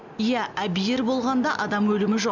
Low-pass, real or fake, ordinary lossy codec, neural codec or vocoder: 7.2 kHz; real; none; none